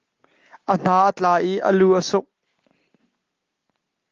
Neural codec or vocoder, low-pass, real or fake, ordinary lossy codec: none; 7.2 kHz; real; Opus, 32 kbps